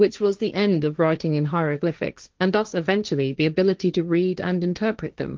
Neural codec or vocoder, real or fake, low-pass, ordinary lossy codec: codec, 16 kHz, 1.1 kbps, Voila-Tokenizer; fake; 7.2 kHz; Opus, 32 kbps